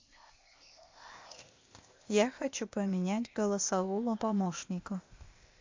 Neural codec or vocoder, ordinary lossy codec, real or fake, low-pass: codec, 16 kHz, 0.8 kbps, ZipCodec; MP3, 48 kbps; fake; 7.2 kHz